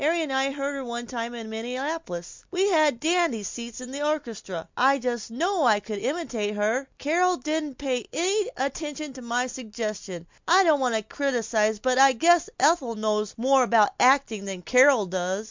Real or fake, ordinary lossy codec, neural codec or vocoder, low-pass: real; MP3, 64 kbps; none; 7.2 kHz